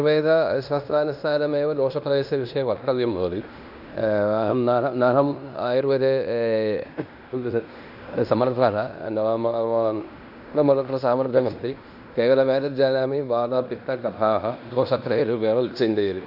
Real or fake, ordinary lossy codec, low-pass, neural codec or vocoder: fake; none; 5.4 kHz; codec, 16 kHz in and 24 kHz out, 0.9 kbps, LongCat-Audio-Codec, fine tuned four codebook decoder